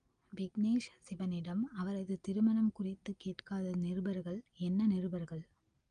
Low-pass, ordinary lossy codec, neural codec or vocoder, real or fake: 9.9 kHz; Opus, 32 kbps; none; real